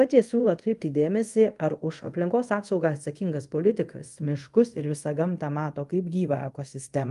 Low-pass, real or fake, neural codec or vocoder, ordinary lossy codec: 10.8 kHz; fake; codec, 24 kHz, 0.5 kbps, DualCodec; Opus, 32 kbps